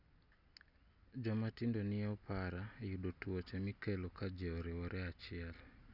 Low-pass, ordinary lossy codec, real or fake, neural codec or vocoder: 5.4 kHz; none; real; none